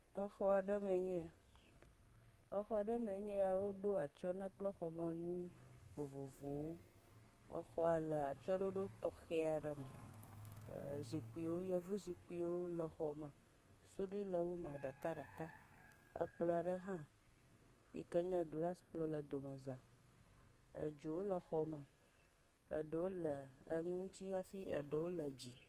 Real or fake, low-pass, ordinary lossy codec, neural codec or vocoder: fake; 14.4 kHz; Opus, 32 kbps; codec, 32 kHz, 1.9 kbps, SNAC